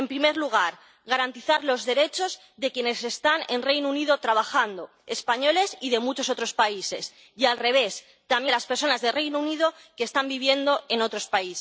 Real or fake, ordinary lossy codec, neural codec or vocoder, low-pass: real; none; none; none